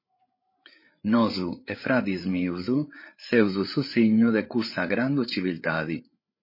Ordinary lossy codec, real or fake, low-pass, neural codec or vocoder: MP3, 24 kbps; fake; 5.4 kHz; codec, 16 kHz, 8 kbps, FreqCodec, larger model